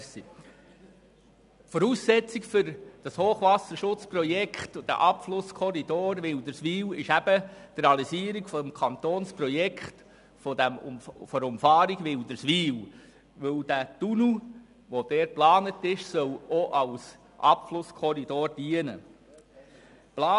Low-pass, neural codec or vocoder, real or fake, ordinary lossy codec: 10.8 kHz; none; real; none